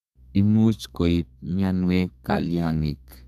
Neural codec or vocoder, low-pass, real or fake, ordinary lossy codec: codec, 32 kHz, 1.9 kbps, SNAC; 14.4 kHz; fake; none